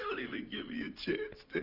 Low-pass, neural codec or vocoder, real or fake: 5.4 kHz; none; real